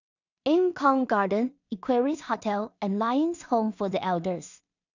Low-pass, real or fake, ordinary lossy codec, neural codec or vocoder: 7.2 kHz; fake; none; codec, 16 kHz in and 24 kHz out, 0.4 kbps, LongCat-Audio-Codec, two codebook decoder